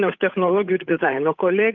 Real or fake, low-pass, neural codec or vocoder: fake; 7.2 kHz; codec, 16 kHz, 8 kbps, FunCodec, trained on Chinese and English, 25 frames a second